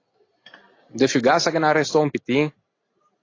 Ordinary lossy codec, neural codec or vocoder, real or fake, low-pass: AAC, 32 kbps; none; real; 7.2 kHz